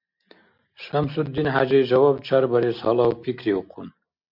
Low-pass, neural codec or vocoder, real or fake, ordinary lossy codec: 5.4 kHz; none; real; MP3, 48 kbps